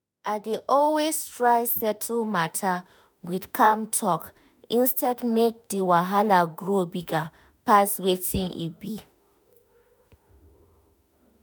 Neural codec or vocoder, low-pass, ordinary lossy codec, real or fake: autoencoder, 48 kHz, 32 numbers a frame, DAC-VAE, trained on Japanese speech; none; none; fake